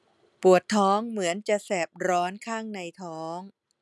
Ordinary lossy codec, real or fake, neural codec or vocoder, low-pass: none; real; none; none